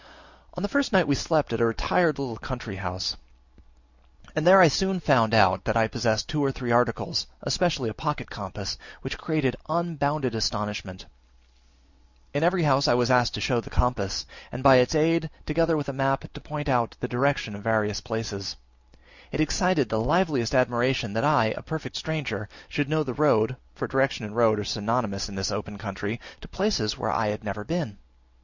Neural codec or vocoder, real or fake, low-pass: none; real; 7.2 kHz